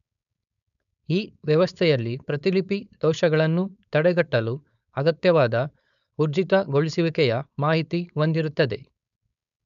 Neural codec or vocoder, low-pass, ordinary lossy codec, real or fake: codec, 16 kHz, 4.8 kbps, FACodec; 7.2 kHz; none; fake